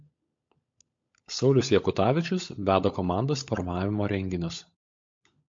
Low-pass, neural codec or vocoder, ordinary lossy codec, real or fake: 7.2 kHz; codec, 16 kHz, 16 kbps, FunCodec, trained on LibriTTS, 50 frames a second; MP3, 48 kbps; fake